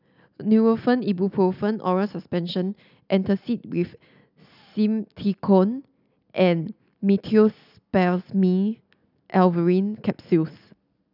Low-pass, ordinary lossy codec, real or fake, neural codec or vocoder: 5.4 kHz; none; real; none